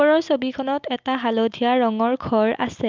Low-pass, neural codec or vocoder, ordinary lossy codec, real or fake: 7.2 kHz; none; Opus, 24 kbps; real